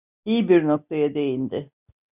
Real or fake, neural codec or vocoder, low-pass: real; none; 3.6 kHz